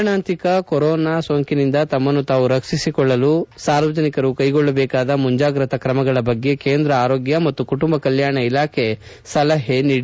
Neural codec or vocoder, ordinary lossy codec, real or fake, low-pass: none; none; real; none